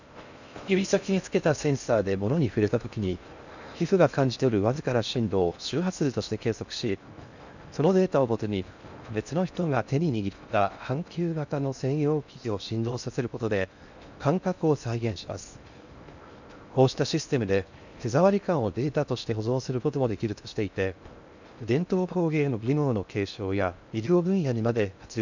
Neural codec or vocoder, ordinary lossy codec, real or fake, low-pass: codec, 16 kHz in and 24 kHz out, 0.6 kbps, FocalCodec, streaming, 4096 codes; none; fake; 7.2 kHz